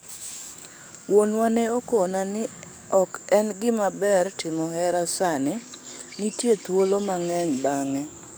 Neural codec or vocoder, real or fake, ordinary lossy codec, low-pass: codec, 44.1 kHz, 7.8 kbps, DAC; fake; none; none